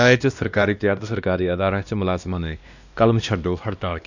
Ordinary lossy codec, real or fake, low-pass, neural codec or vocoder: none; fake; 7.2 kHz; codec, 16 kHz, 1 kbps, X-Codec, WavLM features, trained on Multilingual LibriSpeech